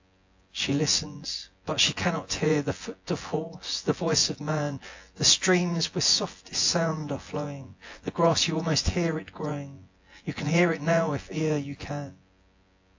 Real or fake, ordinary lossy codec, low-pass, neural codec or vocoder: fake; AAC, 48 kbps; 7.2 kHz; vocoder, 24 kHz, 100 mel bands, Vocos